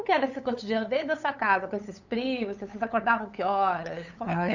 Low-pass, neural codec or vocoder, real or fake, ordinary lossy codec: 7.2 kHz; codec, 16 kHz, 8 kbps, FunCodec, trained on LibriTTS, 25 frames a second; fake; none